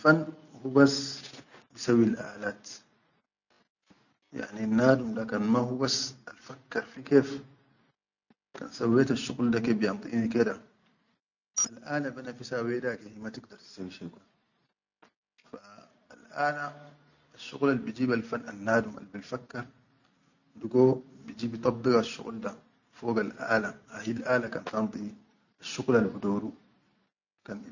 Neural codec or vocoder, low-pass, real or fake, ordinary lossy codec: none; 7.2 kHz; real; none